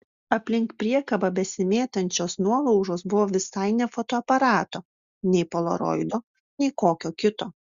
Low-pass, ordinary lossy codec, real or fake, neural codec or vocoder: 7.2 kHz; Opus, 64 kbps; real; none